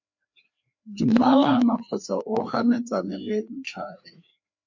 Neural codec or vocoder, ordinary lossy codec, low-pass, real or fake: codec, 16 kHz, 2 kbps, FreqCodec, larger model; MP3, 48 kbps; 7.2 kHz; fake